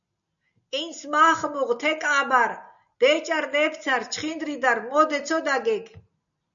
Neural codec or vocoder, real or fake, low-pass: none; real; 7.2 kHz